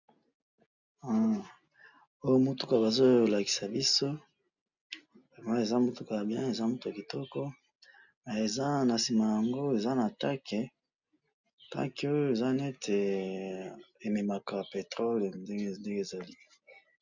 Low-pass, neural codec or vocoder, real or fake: 7.2 kHz; none; real